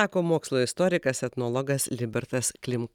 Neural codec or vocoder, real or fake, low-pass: none; real; 19.8 kHz